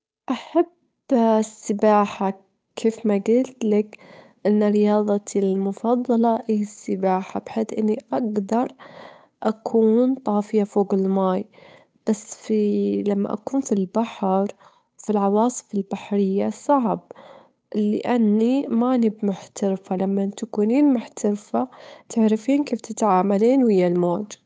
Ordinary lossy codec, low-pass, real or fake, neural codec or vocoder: none; none; fake; codec, 16 kHz, 8 kbps, FunCodec, trained on Chinese and English, 25 frames a second